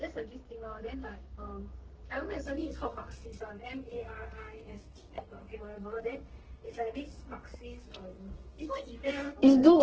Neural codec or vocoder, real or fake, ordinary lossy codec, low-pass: codec, 32 kHz, 1.9 kbps, SNAC; fake; Opus, 16 kbps; 7.2 kHz